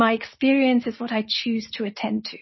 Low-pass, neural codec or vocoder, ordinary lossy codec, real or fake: 7.2 kHz; none; MP3, 24 kbps; real